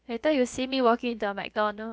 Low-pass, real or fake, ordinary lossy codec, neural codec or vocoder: none; fake; none; codec, 16 kHz, about 1 kbps, DyCAST, with the encoder's durations